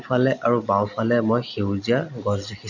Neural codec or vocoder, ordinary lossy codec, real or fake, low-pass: none; none; real; 7.2 kHz